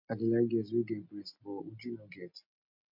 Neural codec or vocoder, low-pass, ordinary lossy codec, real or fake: none; 5.4 kHz; MP3, 48 kbps; real